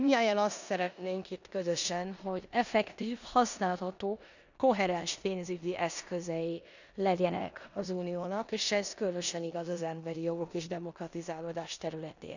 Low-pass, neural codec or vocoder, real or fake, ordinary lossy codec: 7.2 kHz; codec, 16 kHz in and 24 kHz out, 0.9 kbps, LongCat-Audio-Codec, four codebook decoder; fake; none